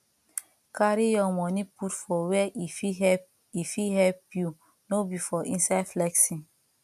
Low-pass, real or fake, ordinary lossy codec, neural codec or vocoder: 14.4 kHz; real; none; none